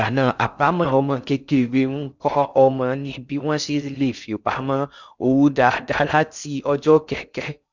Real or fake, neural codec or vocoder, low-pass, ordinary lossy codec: fake; codec, 16 kHz in and 24 kHz out, 0.6 kbps, FocalCodec, streaming, 2048 codes; 7.2 kHz; none